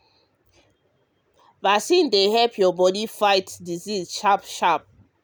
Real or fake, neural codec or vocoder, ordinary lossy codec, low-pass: real; none; none; none